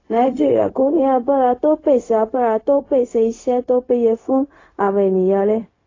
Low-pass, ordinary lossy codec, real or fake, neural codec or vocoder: 7.2 kHz; AAC, 32 kbps; fake; codec, 16 kHz, 0.4 kbps, LongCat-Audio-Codec